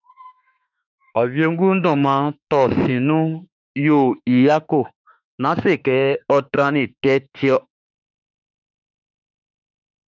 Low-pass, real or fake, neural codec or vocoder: 7.2 kHz; fake; autoencoder, 48 kHz, 32 numbers a frame, DAC-VAE, trained on Japanese speech